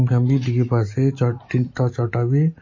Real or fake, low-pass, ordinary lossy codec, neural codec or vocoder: real; 7.2 kHz; MP3, 32 kbps; none